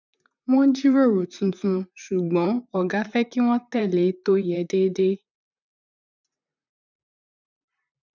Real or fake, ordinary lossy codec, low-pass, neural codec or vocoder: fake; none; 7.2 kHz; vocoder, 44.1 kHz, 128 mel bands, Pupu-Vocoder